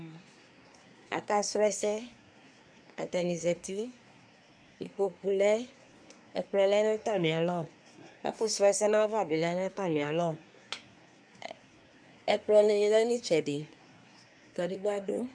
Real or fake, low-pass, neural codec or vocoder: fake; 9.9 kHz; codec, 24 kHz, 1 kbps, SNAC